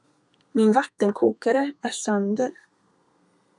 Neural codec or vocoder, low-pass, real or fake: codec, 32 kHz, 1.9 kbps, SNAC; 10.8 kHz; fake